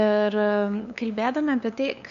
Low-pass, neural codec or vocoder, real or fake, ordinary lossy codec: 7.2 kHz; codec, 16 kHz, 2 kbps, FunCodec, trained on LibriTTS, 25 frames a second; fake; MP3, 96 kbps